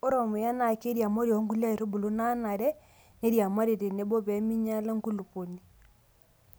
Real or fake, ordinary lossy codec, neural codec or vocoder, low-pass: real; none; none; none